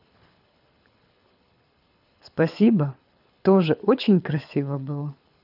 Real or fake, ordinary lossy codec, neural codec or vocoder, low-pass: fake; none; codec, 24 kHz, 6 kbps, HILCodec; 5.4 kHz